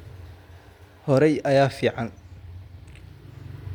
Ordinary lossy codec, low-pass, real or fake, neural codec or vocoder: MP3, 96 kbps; 19.8 kHz; real; none